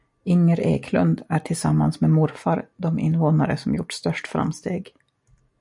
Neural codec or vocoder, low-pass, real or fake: none; 10.8 kHz; real